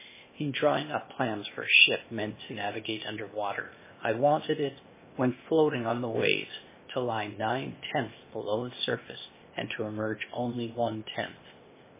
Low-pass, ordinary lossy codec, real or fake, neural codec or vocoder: 3.6 kHz; MP3, 16 kbps; fake; codec, 16 kHz, 0.8 kbps, ZipCodec